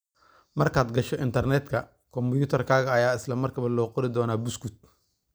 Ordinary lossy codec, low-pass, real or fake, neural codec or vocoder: none; none; real; none